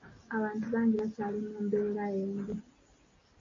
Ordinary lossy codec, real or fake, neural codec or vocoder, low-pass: MP3, 48 kbps; real; none; 7.2 kHz